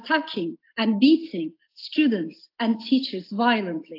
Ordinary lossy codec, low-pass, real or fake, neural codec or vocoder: AAC, 32 kbps; 5.4 kHz; real; none